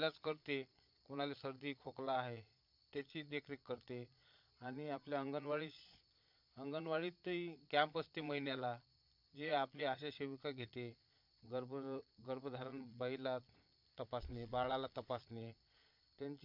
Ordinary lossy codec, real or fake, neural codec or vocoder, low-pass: MP3, 48 kbps; fake; vocoder, 22.05 kHz, 80 mel bands, Vocos; 5.4 kHz